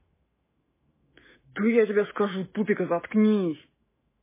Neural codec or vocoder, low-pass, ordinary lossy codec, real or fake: autoencoder, 48 kHz, 128 numbers a frame, DAC-VAE, trained on Japanese speech; 3.6 kHz; MP3, 16 kbps; fake